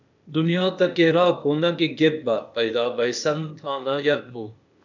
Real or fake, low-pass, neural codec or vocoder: fake; 7.2 kHz; codec, 16 kHz, 0.8 kbps, ZipCodec